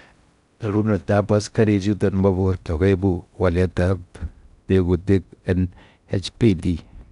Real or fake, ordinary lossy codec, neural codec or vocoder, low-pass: fake; none; codec, 16 kHz in and 24 kHz out, 0.6 kbps, FocalCodec, streaming, 4096 codes; 10.8 kHz